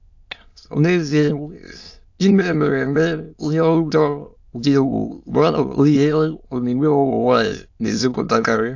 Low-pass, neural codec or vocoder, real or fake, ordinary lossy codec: 7.2 kHz; autoencoder, 22.05 kHz, a latent of 192 numbers a frame, VITS, trained on many speakers; fake; AAC, 48 kbps